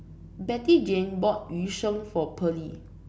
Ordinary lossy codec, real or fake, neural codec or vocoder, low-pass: none; real; none; none